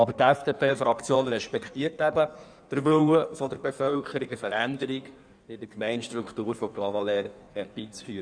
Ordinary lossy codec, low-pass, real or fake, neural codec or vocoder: none; 9.9 kHz; fake; codec, 16 kHz in and 24 kHz out, 1.1 kbps, FireRedTTS-2 codec